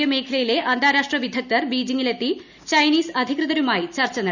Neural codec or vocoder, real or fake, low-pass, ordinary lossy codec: none; real; 7.2 kHz; none